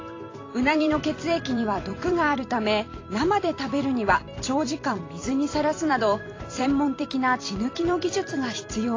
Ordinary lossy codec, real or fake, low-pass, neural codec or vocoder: AAC, 32 kbps; fake; 7.2 kHz; vocoder, 44.1 kHz, 128 mel bands every 256 samples, BigVGAN v2